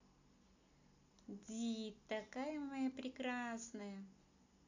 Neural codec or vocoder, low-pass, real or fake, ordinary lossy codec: none; 7.2 kHz; real; AAC, 48 kbps